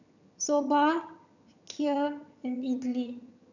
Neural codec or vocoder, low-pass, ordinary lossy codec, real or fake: vocoder, 22.05 kHz, 80 mel bands, HiFi-GAN; 7.2 kHz; none; fake